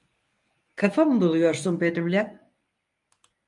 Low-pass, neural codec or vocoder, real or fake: 10.8 kHz; codec, 24 kHz, 0.9 kbps, WavTokenizer, medium speech release version 1; fake